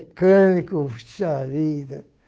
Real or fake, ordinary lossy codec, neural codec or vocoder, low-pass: fake; none; codec, 16 kHz, 2 kbps, FunCodec, trained on Chinese and English, 25 frames a second; none